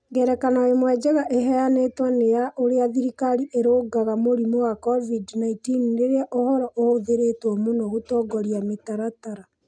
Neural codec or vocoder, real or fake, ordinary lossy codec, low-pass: none; real; none; 9.9 kHz